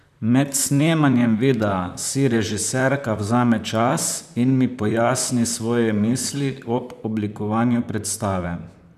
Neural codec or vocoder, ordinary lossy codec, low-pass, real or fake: vocoder, 44.1 kHz, 128 mel bands, Pupu-Vocoder; none; 14.4 kHz; fake